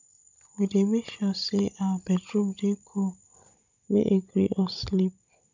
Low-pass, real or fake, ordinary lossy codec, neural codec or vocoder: 7.2 kHz; fake; none; codec, 16 kHz, 16 kbps, FunCodec, trained on Chinese and English, 50 frames a second